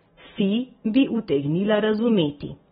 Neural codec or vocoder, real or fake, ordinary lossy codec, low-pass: none; real; AAC, 16 kbps; 19.8 kHz